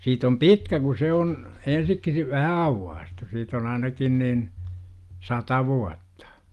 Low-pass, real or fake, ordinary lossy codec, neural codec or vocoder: 14.4 kHz; real; Opus, 32 kbps; none